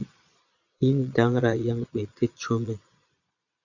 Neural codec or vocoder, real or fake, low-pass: vocoder, 22.05 kHz, 80 mel bands, Vocos; fake; 7.2 kHz